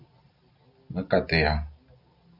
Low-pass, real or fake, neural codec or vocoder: 5.4 kHz; real; none